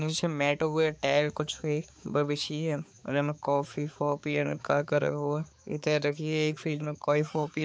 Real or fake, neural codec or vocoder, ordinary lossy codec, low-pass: fake; codec, 16 kHz, 4 kbps, X-Codec, HuBERT features, trained on balanced general audio; none; none